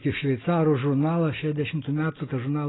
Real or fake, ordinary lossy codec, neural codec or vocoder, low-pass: real; AAC, 16 kbps; none; 7.2 kHz